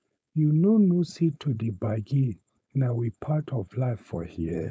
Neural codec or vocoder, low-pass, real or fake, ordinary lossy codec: codec, 16 kHz, 4.8 kbps, FACodec; none; fake; none